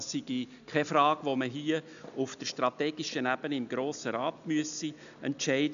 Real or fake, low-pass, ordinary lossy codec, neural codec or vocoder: real; 7.2 kHz; none; none